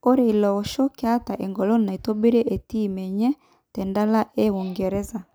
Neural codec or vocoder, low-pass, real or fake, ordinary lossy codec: none; none; real; none